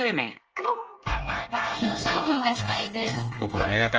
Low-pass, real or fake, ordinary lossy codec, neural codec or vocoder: 7.2 kHz; fake; Opus, 24 kbps; codec, 24 kHz, 1 kbps, SNAC